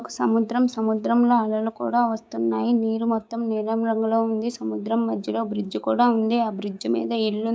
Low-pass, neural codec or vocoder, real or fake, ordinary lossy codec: none; codec, 16 kHz, 6 kbps, DAC; fake; none